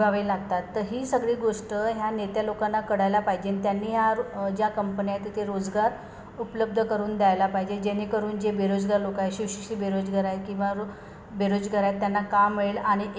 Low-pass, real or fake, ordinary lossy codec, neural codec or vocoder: none; real; none; none